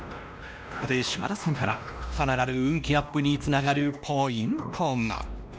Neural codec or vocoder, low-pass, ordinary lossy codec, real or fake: codec, 16 kHz, 1 kbps, X-Codec, WavLM features, trained on Multilingual LibriSpeech; none; none; fake